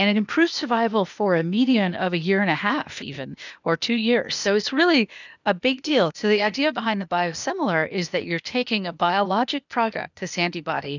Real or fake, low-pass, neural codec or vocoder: fake; 7.2 kHz; codec, 16 kHz, 0.8 kbps, ZipCodec